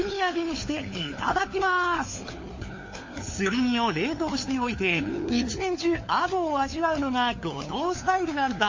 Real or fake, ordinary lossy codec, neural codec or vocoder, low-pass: fake; MP3, 32 kbps; codec, 16 kHz, 4 kbps, FunCodec, trained on LibriTTS, 50 frames a second; 7.2 kHz